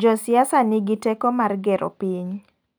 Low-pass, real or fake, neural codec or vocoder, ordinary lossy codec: none; real; none; none